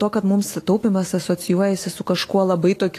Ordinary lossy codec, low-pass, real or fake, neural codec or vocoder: AAC, 48 kbps; 14.4 kHz; fake; autoencoder, 48 kHz, 128 numbers a frame, DAC-VAE, trained on Japanese speech